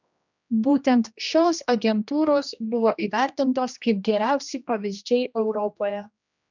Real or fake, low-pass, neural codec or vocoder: fake; 7.2 kHz; codec, 16 kHz, 1 kbps, X-Codec, HuBERT features, trained on general audio